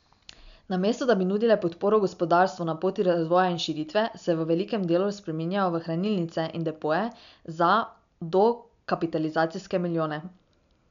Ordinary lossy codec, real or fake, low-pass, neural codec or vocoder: none; real; 7.2 kHz; none